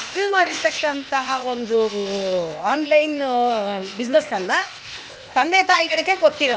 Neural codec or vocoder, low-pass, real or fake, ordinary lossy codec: codec, 16 kHz, 0.8 kbps, ZipCodec; none; fake; none